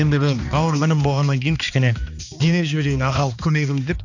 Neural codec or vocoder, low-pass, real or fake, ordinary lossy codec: codec, 16 kHz, 2 kbps, X-Codec, HuBERT features, trained on balanced general audio; 7.2 kHz; fake; none